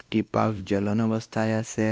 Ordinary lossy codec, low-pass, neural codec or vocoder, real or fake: none; none; codec, 16 kHz, 1 kbps, X-Codec, WavLM features, trained on Multilingual LibriSpeech; fake